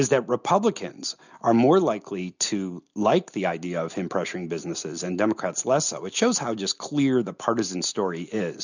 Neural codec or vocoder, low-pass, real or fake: none; 7.2 kHz; real